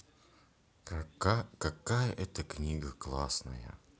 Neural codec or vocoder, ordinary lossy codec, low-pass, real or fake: none; none; none; real